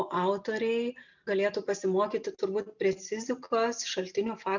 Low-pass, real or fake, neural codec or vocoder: 7.2 kHz; real; none